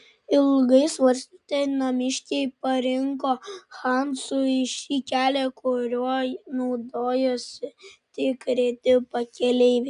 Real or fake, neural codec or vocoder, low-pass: real; none; 10.8 kHz